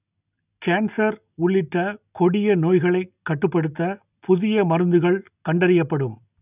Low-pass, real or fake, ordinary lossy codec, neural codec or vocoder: 3.6 kHz; real; none; none